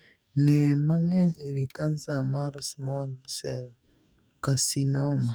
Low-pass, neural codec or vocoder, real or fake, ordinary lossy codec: none; codec, 44.1 kHz, 2.6 kbps, DAC; fake; none